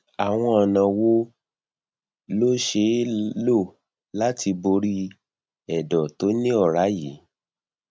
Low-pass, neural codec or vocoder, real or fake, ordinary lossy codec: none; none; real; none